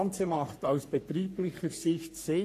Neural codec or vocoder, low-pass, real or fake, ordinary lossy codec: codec, 44.1 kHz, 3.4 kbps, Pupu-Codec; 14.4 kHz; fake; AAC, 64 kbps